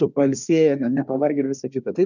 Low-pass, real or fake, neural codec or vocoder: 7.2 kHz; fake; codec, 24 kHz, 1 kbps, SNAC